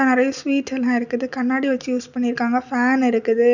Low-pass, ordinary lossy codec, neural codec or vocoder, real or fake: 7.2 kHz; none; none; real